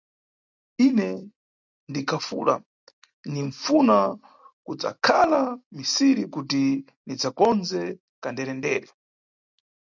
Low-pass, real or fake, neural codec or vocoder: 7.2 kHz; real; none